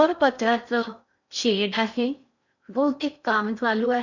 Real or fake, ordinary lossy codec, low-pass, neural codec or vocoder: fake; none; 7.2 kHz; codec, 16 kHz in and 24 kHz out, 0.6 kbps, FocalCodec, streaming, 4096 codes